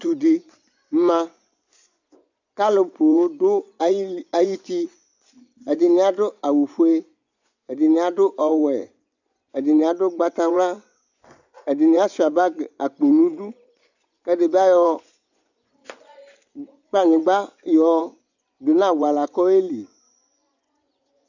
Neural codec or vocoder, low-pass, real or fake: vocoder, 24 kHz, 100 mel bands, Vocos; 7.2 kHz; fake